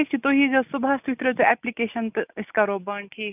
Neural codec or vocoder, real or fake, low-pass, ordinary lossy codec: none; real; 3.6 kHz; none